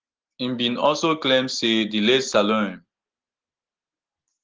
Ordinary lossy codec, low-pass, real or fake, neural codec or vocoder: Opus, 16 kbps; 7.2 kHz; real; none